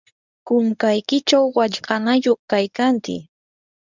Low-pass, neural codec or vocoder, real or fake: 7.2 kHz; codec, 24 kHz, 0.9 kbps, WavTokenizer, medium speech release version 1; fake